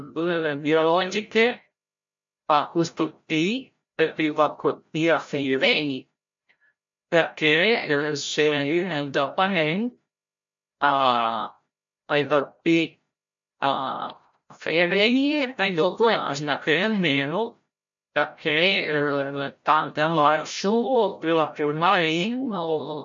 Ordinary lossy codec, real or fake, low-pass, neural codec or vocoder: MP3, 48 kbps; fake; 7.2 kHz; codec, 16 kHz, 0.5 kbps, FreqCodec, larger model